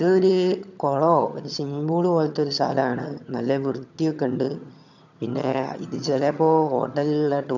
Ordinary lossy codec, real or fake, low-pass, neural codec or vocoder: none; fake; 7.2 kHz; vocoder, 22.05 kHz, 80 mel bands, HiFi-GAN